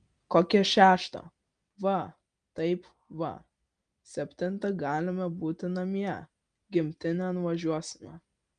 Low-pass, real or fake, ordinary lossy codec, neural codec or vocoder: 9.9 kHz; real; Opus, 32 kbps; none